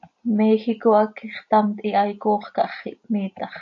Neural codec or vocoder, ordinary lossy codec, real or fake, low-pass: none; AAC, 48 kbps; real; 7.2 kHz